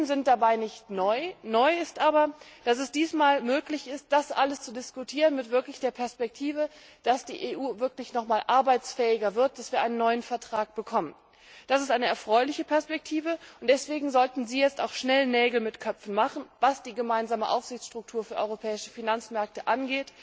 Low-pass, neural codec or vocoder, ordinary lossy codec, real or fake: none; none; none; real